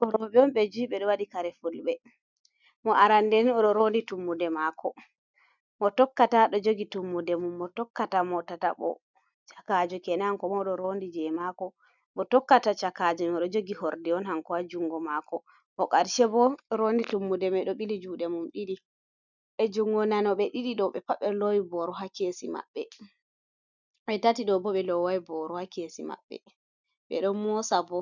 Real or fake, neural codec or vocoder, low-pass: real; none; 7.2 kHz